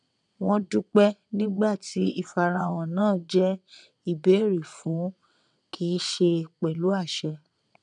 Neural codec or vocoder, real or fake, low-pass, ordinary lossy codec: vocoder, 22.05 kHz, 80 mel bands, WaveNeXt; fake; 9.9 kHz; none